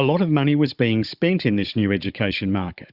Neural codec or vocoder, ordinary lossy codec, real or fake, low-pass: codec, 16 kHz, 16 kbps, FunCodec, trained on Chinese and English, 50 frames a second; Opus, 64 kbps; fake; 5.4 kHz